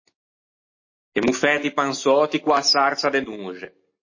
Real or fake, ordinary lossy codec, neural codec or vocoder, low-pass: real; MP3, 32 kbps; none; 7.2 kHz